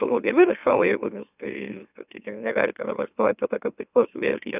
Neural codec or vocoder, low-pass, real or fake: autoencoder, 44.1 kHz, a latent of 192 numbers a frame, MeloTTS; 3.6 kHz; fake